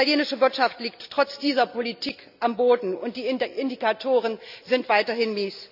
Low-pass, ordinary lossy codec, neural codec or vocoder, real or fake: 5.4 kHz; none; none; real